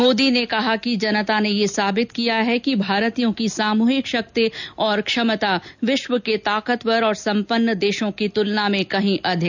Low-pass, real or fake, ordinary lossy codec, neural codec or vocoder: 7.2 kHz; real; none; none